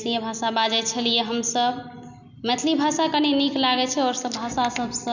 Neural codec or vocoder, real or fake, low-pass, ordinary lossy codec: none; real; 7.2 kHz; none